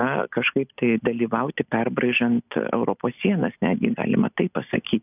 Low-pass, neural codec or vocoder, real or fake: 3.6 kHz; none; real